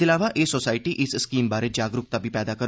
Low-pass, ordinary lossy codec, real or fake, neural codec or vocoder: none; none; real; none